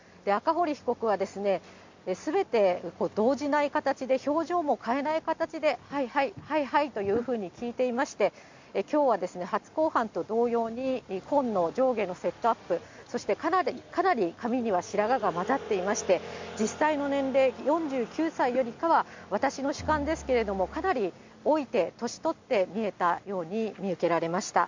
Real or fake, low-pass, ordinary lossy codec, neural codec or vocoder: real; 7.2 kHz; MP3, 64 kbps; none